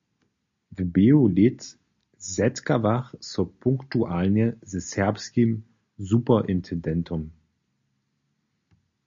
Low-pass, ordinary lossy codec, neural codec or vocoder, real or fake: 7.2 kHz; MP3, 48 kbps; none; real